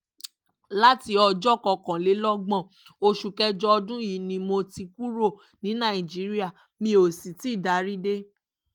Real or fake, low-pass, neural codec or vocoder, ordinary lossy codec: real; 19.8 kHz; none; Opus, 32 kbps